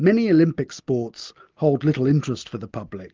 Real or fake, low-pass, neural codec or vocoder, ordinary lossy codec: real; 7.2 kHz; none; Opus, 32 kbps